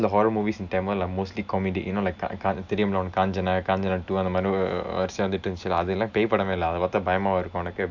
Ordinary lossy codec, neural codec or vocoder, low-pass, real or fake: none; none; 7.2 kHz; real